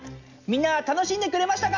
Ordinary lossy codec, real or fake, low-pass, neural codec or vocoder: none; real; 7.2 kHz; none